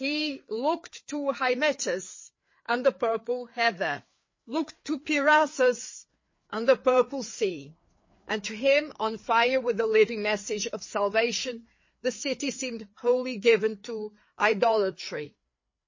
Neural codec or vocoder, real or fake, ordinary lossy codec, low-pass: codec, 16 kHz, 4 kbps, FreqCodec, larger model; fake; MP3, 32 kbps; 7.2 kHz